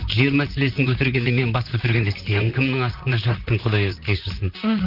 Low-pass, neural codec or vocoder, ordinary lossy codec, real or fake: 5.4 kHz; vocoder, 44.1 kHz, 128 mel bands, Pupu-Vocoder; Opus, 24 kbps; fake